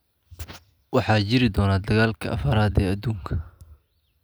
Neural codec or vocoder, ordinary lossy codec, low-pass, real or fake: none; none; none; real